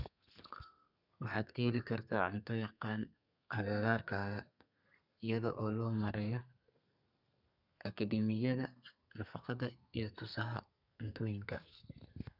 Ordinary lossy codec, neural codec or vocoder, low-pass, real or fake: none; codec, 32 kHz, 1.9 kbps, SNAC; 5.4 kHz; fake